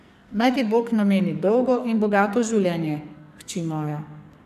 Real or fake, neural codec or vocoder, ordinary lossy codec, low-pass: fake; codec, 44.1 kHz, 2.6 kbps, SNAC; none; 14.4 kHz